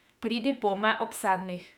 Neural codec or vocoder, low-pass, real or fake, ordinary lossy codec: autoencoder, 48 kHz, 32 numbers a frame, DAC-VAE, trained on Japanese speech; 19.8 kHz; fake; none